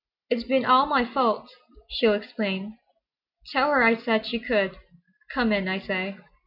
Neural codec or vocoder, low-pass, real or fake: none; 5.4 kHz; real